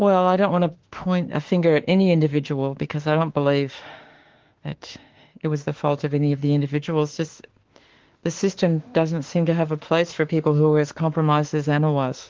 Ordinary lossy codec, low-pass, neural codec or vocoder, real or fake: Opus, 16 kbps; 7.2 kHz; autoencoder, 48 kHz, 32 numbers a frame, DAC-VAE, trained on Japanese speech; fake